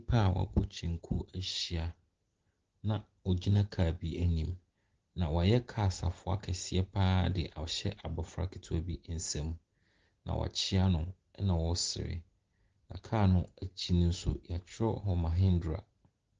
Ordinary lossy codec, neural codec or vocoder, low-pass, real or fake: Opus, 16 kbps; none; 7.2 kHz; real